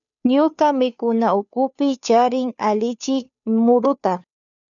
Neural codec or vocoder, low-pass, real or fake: codec, 16 kHz, 2 kbps, FunCodec, trained on Chinese and English, 25 frames a second; 7.2 kHz; fake